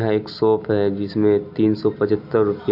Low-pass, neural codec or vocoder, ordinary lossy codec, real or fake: 5.4 kHz; none; none; real